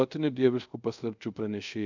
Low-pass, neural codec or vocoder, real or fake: 7.2 kHz; codec, 24 kHz, 0.5 kbps, DualCodec; fake